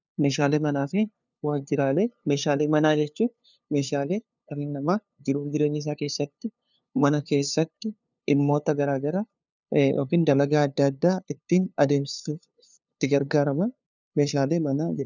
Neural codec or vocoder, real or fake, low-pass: codec, 16 kHz, 2 kbps, FunCodec, trained on LibriTTS, 25 frames a second; fake; 7.2 kHz